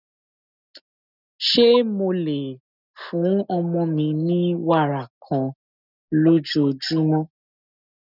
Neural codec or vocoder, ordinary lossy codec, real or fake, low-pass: none; none; real; 5.4 kHz